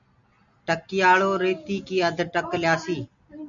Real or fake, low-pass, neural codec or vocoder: real; 7.2 kHz; none